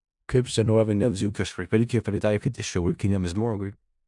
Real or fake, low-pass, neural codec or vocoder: fake; 10.8 kHz; codec, 16 kHz in and 24 kHz out, 0.4 kbps, LongCat-Audio-Codec, four codebook decoder